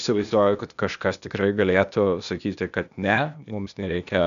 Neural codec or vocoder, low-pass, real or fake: codec, 16 kHz, 0.8 kbps, ZipCodec; 7.2 kHz; fake